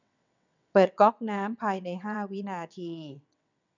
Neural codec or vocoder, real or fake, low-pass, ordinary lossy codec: vocoder, 22.05 kHz, 80 mel bands, WaveNeXt; fake; 7.2 kHz; none